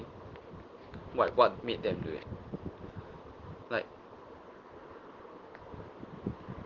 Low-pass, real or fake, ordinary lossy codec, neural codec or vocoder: 7.2 kHz; real; Opus, 16 kbps; none